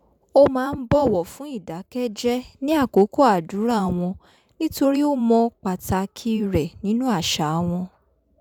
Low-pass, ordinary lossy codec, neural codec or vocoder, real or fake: 19.8 kHz; none; vocoder, 44.1 kHz, 128 mel bands every 512 samples, BigVGAN v2; fake